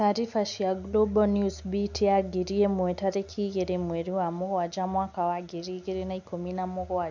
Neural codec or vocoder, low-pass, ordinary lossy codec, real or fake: none; 7.2 kHz; none; real